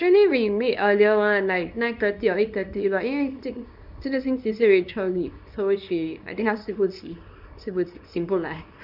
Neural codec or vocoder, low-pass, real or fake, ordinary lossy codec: codec, 24 kHz, 0.9 kbps, WavTokenizer, small release; 5.4 kHz; fake; none